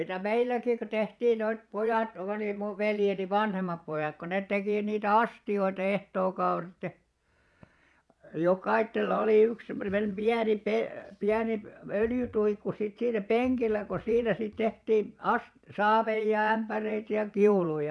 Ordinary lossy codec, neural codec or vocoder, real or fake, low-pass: none; vocoder, 22.05 kHz, 80 mel bands, Vocos; fake; none